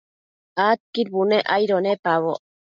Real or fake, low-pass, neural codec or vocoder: real; 7.2 kHz; none